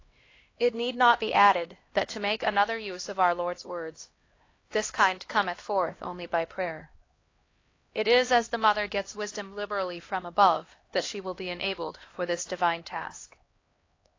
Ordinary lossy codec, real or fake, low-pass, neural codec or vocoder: AAC, 32 kbps; fake; 7.2 kHz; codec, 16 kHz, 1 kbps, X-Codec, HuBERT features, trained on LibriSpeech